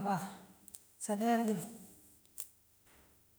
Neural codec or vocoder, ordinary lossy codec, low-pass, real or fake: autoencoder, 48 kHz, 32 numbers a frame, DAC-VAE, trained on Japanese speech; none; none; fake